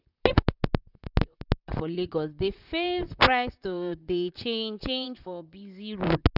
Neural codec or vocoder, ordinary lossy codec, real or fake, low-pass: vocoder, 44.1 kHz, 128 mel bands, Pupu-Vocoder; none; fake; 5.4 kHz